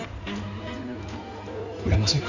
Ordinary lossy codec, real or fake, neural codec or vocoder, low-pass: none; fake; codec, 16 kHz in and 24 kHz out, 2.2 kbps, FireRedTTS-2 codec; 7.2 kHz